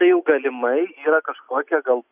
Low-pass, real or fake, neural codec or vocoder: 3.6 kHz; real; none